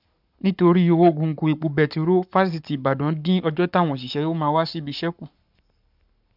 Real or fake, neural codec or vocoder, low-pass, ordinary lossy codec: fake; codec, 44.1 kHz, 7.8 kbps, Pupu-Codec; 5.4 kHz; none